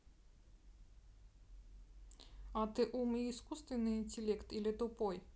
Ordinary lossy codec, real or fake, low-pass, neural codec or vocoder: none; real; none; none